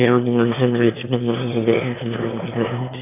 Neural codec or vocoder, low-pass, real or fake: autoencoder, 22.05 kHz, a latent of 192 numbers a frame, VITS, trained on one speaker; 3.6 kHz; fake